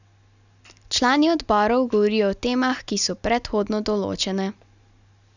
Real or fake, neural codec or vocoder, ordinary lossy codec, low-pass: real; none; none; 7.2 kHz